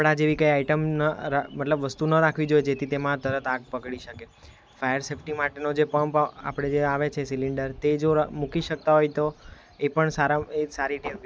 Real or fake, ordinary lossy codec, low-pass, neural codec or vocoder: real; none; none; none